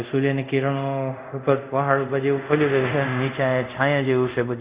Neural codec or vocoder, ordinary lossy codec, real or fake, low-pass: codec, 24 kHz, 0.5 kbps, DualCodec; Opus, 64 kbps; fake; 3.6 kHz